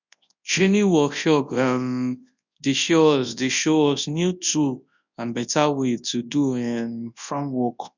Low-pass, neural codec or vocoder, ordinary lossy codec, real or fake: 7.2 kHz; codec, 24 kHz, 0.9 kbps, WavTokenizer, large speech release; none; fake